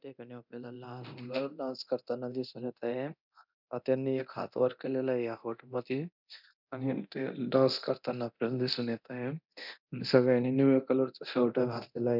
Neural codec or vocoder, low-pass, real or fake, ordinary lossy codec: codec, 24 kHz, 0.9 kbps, DualCodec; 5.4 kHz; fake; none